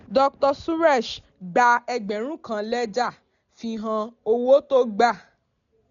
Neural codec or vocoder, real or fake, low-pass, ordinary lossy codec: none; real; 7.2 kHz; none